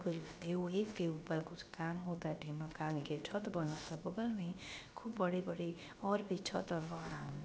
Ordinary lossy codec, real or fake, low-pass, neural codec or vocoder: none; fake; none; codec, 16 kHz, about 1 kbps, DyCAST, with the encoder's durations